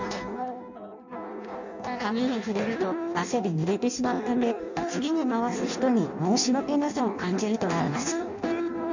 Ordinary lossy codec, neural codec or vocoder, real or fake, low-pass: none; codec, 16 kHz in and 24 kHz out, 0.6 kbps, FireRedTTS-2 codec; fake; 7.2 kHz